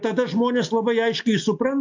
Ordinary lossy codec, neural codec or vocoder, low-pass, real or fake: AAC, 48 kbps; none; 7.2 kHz; real